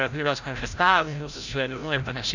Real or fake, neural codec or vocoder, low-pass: fake; codec, 16 kHz, 0.5 kbps, FreqCodec, larger model; 7.2 kHz